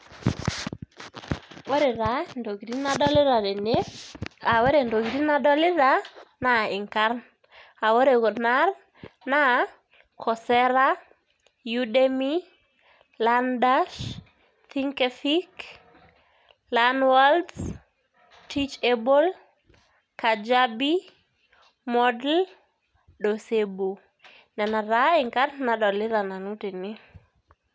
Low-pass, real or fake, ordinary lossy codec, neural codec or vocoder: none; real; none; none